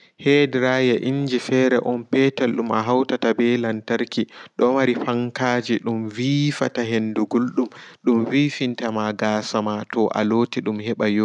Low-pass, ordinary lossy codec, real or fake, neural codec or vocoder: 10.8 kHz; none; real; none